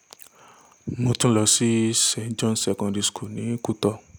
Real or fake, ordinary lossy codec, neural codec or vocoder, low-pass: real; none; none; none